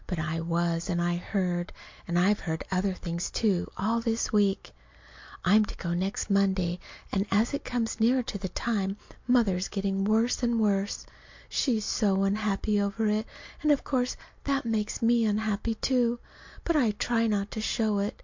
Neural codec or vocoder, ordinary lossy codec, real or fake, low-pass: none; MP3, 48 kbps; real; 7.2 kHz